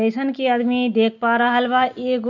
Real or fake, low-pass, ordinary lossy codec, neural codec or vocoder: real; 7.2 kHz; none; none